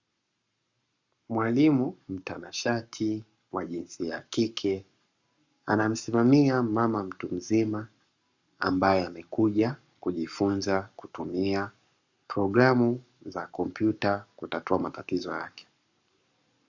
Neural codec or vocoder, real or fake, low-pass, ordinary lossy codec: codec, 44.1 kHz, 7.8 kbps, Pupu-Codec; fake; 7.2 kHz; Opus, 64 kbps